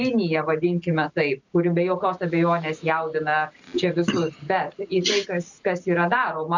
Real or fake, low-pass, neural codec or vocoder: real; 7.2 kHz; none